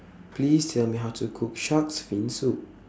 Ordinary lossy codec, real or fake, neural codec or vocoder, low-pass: none; real; none; none